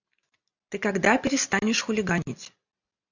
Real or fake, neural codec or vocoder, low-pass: real; none; 7.2 kHz